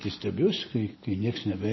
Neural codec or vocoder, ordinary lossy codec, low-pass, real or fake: none; MP3, 24 kbps; 7.2 kHz; real